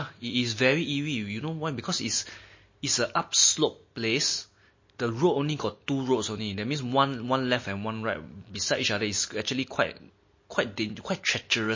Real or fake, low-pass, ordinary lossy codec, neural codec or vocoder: real; 7.2 kHz; MP3, 32 kbps; none